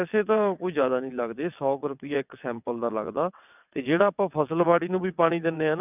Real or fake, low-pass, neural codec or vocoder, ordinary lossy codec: fake; 3.6 kHz; vocoder, 22.05 kHz, 80 mel bands, WaveNeXt; none